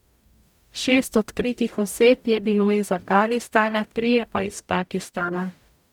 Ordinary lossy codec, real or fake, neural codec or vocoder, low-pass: none; fake; codec, 44.1 kHz, 0.9 kbps, DAC; 19.8 kHz